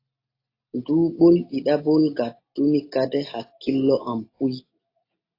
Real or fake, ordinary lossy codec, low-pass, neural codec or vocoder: real; AAC, 32 kbps; 5.4 kHz; none